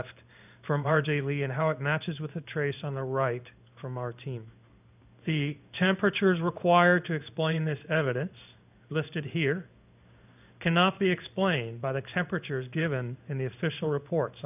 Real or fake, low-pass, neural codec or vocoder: fake; 3.6 kHz; codec, 16 kHz in and 24 kHz out, 1 kbps, XY-Tokenizer